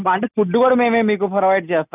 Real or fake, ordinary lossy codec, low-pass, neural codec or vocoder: real; none; 3.6 kHz; none